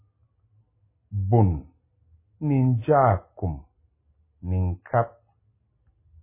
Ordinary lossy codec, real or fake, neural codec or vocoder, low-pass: MP3, 16 kbps; real; none; 3.6 kHz